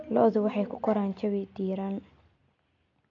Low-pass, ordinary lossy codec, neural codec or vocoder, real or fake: 7.2 kHz; none; none; real